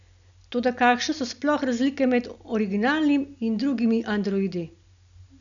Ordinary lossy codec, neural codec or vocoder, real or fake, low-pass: none; none; real; 7.2 kHz